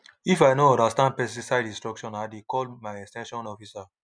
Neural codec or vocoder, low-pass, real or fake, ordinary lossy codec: none; 9.9 kHz; real; none